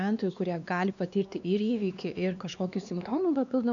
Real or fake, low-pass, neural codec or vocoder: fake; 7.2 kHz; codec, 16 kHz, 2 kbps, X-Codec, WavLM features, trained on Multilingual LibriSpeech